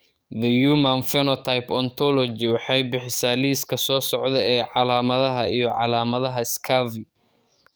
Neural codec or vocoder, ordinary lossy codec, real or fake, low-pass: codec, 44.1 kHz, 7.8 kbps, DAC; none; fake; none